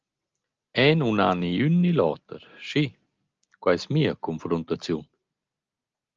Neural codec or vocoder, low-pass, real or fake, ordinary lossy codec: none; 7.2 kHz; real; Opus, 24 kbps